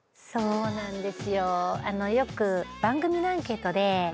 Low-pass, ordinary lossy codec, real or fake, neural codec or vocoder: none; none; real; none